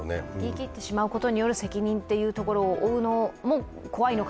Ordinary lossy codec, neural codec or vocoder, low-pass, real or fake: none; none; none; real